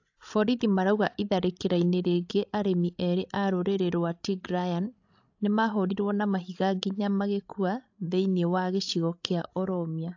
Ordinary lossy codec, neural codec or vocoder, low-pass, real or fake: AAC, 48 kbps; codec, 16 kHz, 16 kbps, FreqCodec, larger model; 7.2 kHz; fake